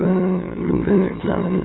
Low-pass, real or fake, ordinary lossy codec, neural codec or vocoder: 7.2 kHz; fake; AAC, 16 kbps; autoencoder, 22.05 kHz, a latent of 192 numbers a frame, VITS, trained on many speakers